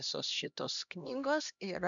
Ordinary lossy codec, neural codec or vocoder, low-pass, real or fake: MP3, 96 kbps; codec, 16 kHz, 1 kbps, X-Codec, HuBERT features, trained on LibriSpeech; 7.2 kHz; fake